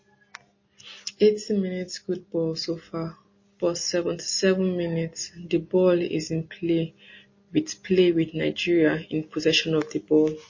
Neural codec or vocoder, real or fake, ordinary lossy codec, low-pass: none; real; MP3, 32 kbps; 7.2 kHz